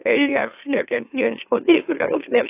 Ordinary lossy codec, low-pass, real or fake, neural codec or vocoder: AAC, 24 kbps; 3.6 kHz; fake; autoencoder, 44.1 kHz, a latent of 192 numbers a frame, MeloTTS